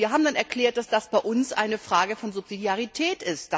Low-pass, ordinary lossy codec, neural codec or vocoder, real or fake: none; none; none; real